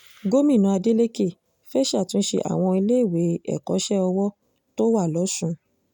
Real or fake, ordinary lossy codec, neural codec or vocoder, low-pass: real; none; none; 19.8 kHz